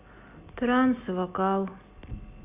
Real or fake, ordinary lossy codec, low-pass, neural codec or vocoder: real; Opus, 64 kbps; 3.6 kHz; none